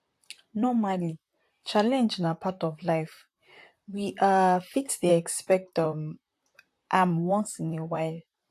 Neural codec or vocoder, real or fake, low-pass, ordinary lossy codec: vocoder, 44.1 kHz, 128 mel bands, Pupu-Vocoder; fake; 14.4 kHz; AAC, 64 kbps